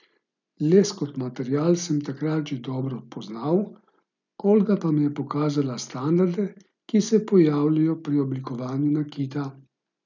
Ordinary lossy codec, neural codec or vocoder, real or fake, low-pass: none; none; real; 7.2 kHz